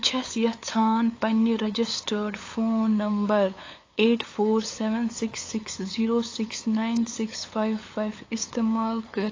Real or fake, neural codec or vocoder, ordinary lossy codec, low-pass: fake; codec, 16 kHz, 8 kbps, FunCodec, trained on LibriTTS, 25 frames a second; AAC, 32 kbps; 7.2 kHz